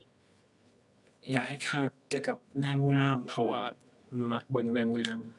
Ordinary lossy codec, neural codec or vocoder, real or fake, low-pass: none; codec, 24 kHz, 0.9 kbps, WavTokenizer, medium music audio release; fake; 10.8 kHz